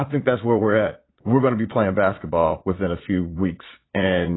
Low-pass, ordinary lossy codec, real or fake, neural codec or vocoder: 7.2 kHz; AAC, 16 kbps; fake; vocoder, 22.05 kHz, 80 mel bands, Vocos